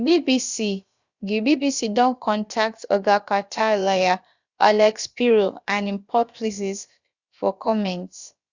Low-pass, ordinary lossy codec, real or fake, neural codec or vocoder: 7.2 kHz; Opus, 64 kbps; fake; codec, 16 kHz, about 1 kbps, DyCAST, with the encoder's durations